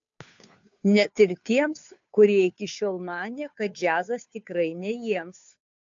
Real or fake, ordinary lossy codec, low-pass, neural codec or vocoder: fake; AAC, 64 kbps; 7.2 kHz; codec, 16 kHz, 2 kbps, FunCodec, trained on Chinese and English, 25 frames a second